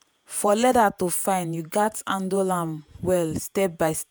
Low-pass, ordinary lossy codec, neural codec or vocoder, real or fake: none; none; vocoder, 48 kHz, 128 mel bands, Vocos; fake